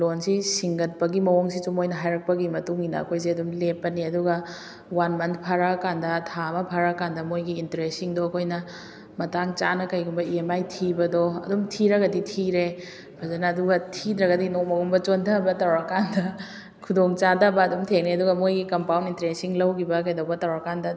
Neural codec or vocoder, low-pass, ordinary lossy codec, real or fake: none; none; none; real